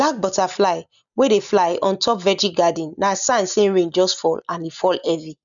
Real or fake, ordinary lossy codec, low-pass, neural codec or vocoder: real; none; 7.2 kHz; none